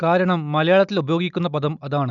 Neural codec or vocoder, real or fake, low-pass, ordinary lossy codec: none; real; 7.2 kHz; none